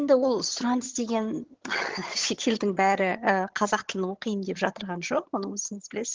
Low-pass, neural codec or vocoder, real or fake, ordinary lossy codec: 7.2 kHz; vocoder, 22.05 kHz, 80 mel bands, HiFi-GAN; fake; Opus, 16 kbps